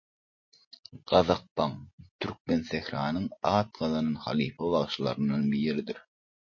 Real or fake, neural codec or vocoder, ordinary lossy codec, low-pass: real; none; MP3, 32 kbps; 7.2 kHz